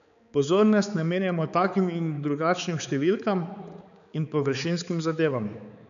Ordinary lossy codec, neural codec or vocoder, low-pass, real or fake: none; codec, 16 kHz, 4 kbps, X-Codec, HuBERT features, trained on balanced general audio; 7.2 kHz; fake